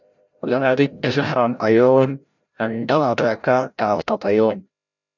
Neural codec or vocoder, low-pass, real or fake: codec, 16 kHz, 0.5 kbps, FreqCodec, larger model; 7.2 kHz; fake